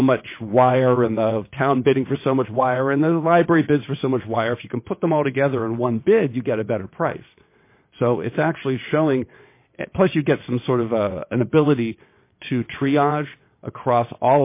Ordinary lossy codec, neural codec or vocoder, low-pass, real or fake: MP3, 24 kbps; vocoder, 22.05 kHz, 80 mel bands, WaveNeXt; 3.6 kHz; fake